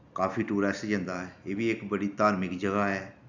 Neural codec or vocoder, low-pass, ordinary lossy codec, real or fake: none; 7.2 kHz; none; real